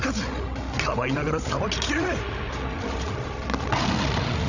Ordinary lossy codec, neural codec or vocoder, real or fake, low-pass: none; codec, 16 kHz, 16 kbps, FreqCodec, larger model; fake; 7.2 kHz